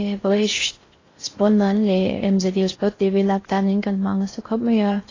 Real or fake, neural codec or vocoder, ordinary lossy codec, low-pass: fake; codec, 16 kHz in and 24 kHz out, 0.6 kbps, FocalCodec, streaming, 4096 codes; AAC, 32 kbps; 7.2 kHz